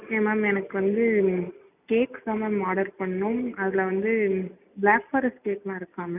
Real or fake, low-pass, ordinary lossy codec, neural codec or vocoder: real; 3.6 kHz; none; none